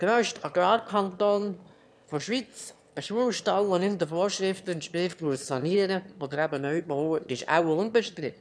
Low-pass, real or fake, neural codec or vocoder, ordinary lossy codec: none; fake; autoencoder, 22.05 kHz, a latent of 192 numbers a frame, VITS, trained on one speaker; none